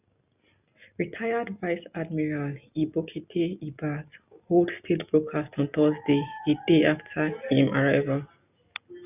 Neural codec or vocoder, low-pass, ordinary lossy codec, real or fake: none; 3.6 kHz; none; real